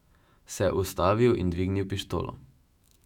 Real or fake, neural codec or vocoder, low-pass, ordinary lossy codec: fake; autoencoder, 48 kHz, 128 numbers a frame, DAC-VAE, trained on Japanese speech; 19.8 kHz; none